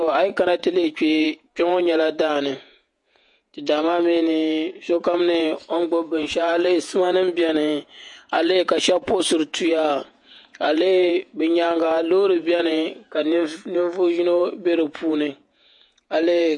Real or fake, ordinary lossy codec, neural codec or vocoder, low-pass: fake; MP3, 48 kbps; vocoder, 48 kHz, 128 mel bands, Vocos; 10.8 kHz